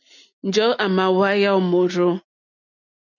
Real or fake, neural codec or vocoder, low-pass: real; none; 7.2 kHz